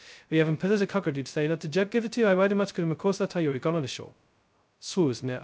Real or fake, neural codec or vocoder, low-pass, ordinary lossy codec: fake; codec, 16 kHz, 0.2 kbps, FocalCodec; none; none